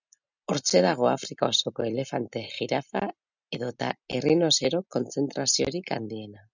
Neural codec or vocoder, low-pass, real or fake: none; 7.2 kHz; real